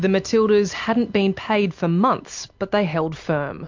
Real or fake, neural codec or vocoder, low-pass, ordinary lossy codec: real; none; 7.2 kHz; MP3, 48 kbps